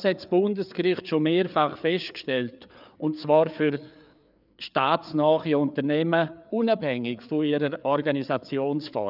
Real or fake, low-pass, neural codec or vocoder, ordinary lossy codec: fake; 5.4 kHz; codec, 16 kHz, 4 kbps, FreqCodec, larger model; none